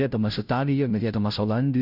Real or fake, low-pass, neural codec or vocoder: fake; 5.4 kHz; codec, 16 kHz, 0.5 kbps, FunCodec, trained on Chinese and English, 25 frames a second